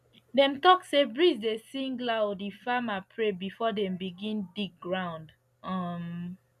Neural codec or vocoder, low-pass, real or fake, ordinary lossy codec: none; 14.4 kHz; real; none